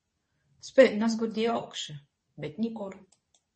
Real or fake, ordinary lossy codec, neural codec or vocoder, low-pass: fake; MP3, 32 kbps; codec, 24 kHz, 0.9 kbps, WavTokenizer, medium speech release version 2; 10.8 kHz